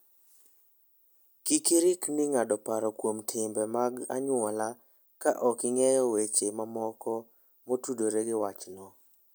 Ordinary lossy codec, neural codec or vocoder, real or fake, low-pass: none; none; real; none